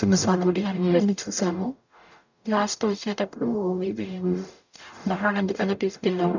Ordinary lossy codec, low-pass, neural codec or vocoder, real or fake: none; 7.2 kHz; codec, 44.1 kHz, 0.9 kbps, DAC; fake